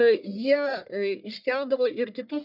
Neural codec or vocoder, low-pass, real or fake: codec, 44.1 kHz, 1.7 kbps, Pupu-Codec; 5.4 kHz; fake